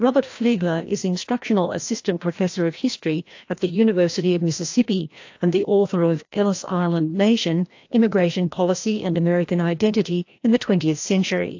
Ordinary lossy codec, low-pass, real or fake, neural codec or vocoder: AAC, 48 kbps; 7.2 kHz; fake; codec, 16 kHz, 1 kbps, FreqCodec, larger model